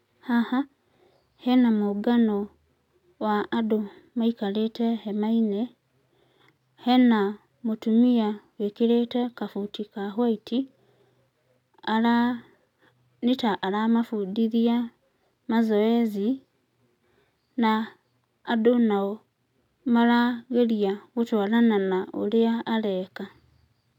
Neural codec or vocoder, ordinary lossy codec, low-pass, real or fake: none; none; 19.8 kHz; real